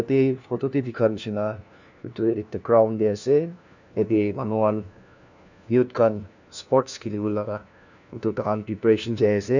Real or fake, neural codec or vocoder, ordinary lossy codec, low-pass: fake; codec, 16 kHz, 1 kbps, FunCodec, trained on LibriTTS, 50 frames a second; none; 7.2 kHz